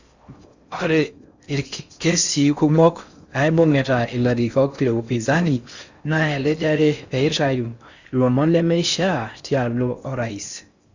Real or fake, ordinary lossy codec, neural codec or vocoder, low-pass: fake; Opus, 64 kbps; codec, 16 kHz in and 24 kHz out, 0.6 kbps, FocalCodec, streaming, 4096 codes; 7.2 kHz